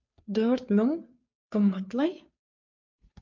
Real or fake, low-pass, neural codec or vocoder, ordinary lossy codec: fake; 7.2 kHz; codec, 16 kHz, 2 kbps, FunCodec, trained on Chinese and English, 25 frames a second; MP3, 48 kbps